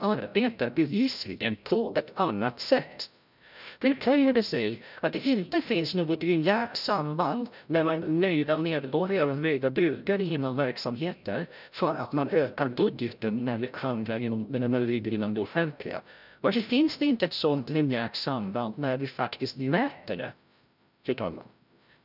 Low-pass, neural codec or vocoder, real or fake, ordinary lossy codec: 5.4 kHz; codec, 16 kHz, 0.5 kbps, FreqCodec, larger model; fake; none